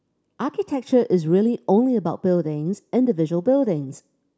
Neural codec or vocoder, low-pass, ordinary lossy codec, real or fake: none; none; none; real